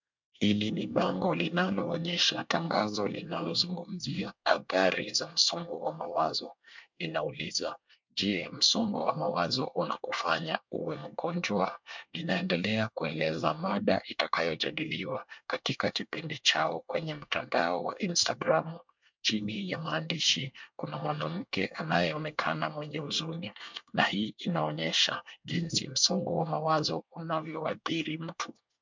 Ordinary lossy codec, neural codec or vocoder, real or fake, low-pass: MP3, 64 kbps; codec, 24 kHz, 1 kbps, SNAC; fake; 7.2 kHz